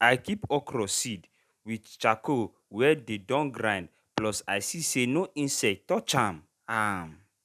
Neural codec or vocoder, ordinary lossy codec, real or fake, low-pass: none; none; real; 14.4 kHz